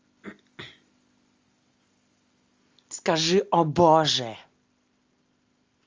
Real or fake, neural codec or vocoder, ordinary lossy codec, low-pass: real; none; Opus, 32 kbps; 7.2 kHz